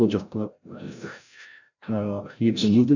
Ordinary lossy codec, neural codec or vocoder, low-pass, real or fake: none; codec, 16 kHz, 0.5 kbps, FreqCodec, larger model; 7.2 kHz; fake